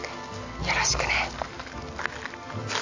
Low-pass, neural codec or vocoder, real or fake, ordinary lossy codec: 7.2 kHz; none; real; AAC, 48 kbps